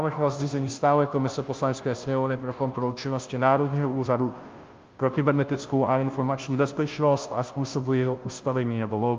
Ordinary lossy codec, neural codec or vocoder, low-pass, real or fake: Opus, 32 kbps; codec, 16 kHz, 0.5 kbps, FunCodec, trained on Chinese and English, 25 frames a second; 7.2 kHz; fake